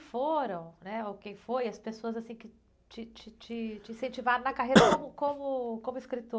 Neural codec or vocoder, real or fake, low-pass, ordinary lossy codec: none; real; none; none